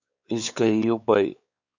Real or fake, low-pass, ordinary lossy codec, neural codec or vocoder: fake; 7.2 kHz; Opus, 64 kbps; codec, 16 kHz, 4 kbps, X-Codec, WavLM features, trained on Multilingual LibriSpeech